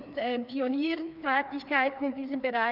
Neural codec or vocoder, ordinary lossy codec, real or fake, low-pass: codec, 16 kHz, 2 kbps, FreqCodec, larger model; none; fake; 5.4 kHz